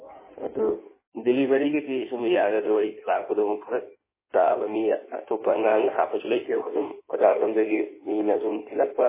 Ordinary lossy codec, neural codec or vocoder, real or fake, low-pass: MP3, 16 kbps; codec, 16 kHz in and 24 kHz out, 1.1 kbps, FireRedTTS-2 codec; fake; 3.6 kHz